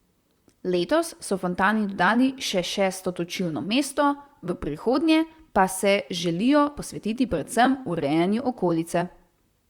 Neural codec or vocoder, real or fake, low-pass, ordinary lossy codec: vocoder, 44.1 kHz, 128 mel bands, Pupu-Vocoder; fake; 19.8 kHz; Opus, 64 kbps